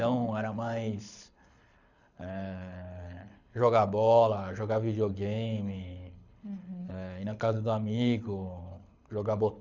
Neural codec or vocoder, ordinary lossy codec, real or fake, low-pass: codec, 24 kHz, 6 kbps, HILCodec; none; fake; 7.2 kHz